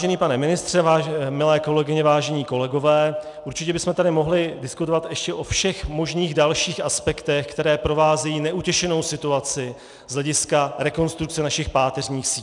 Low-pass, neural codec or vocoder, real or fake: 10.8 kHz; none; real